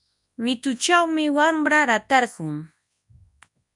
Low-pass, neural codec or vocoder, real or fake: 10.8 kHz; codec, 24 kHz, 0.9 kbps, WavTokenizer, large speech release; fake